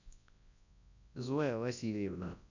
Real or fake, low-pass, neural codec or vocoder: fake; 7.2 kHz; codec, 24 kHz, 0.9 kbps, WavTokenizer, large speech release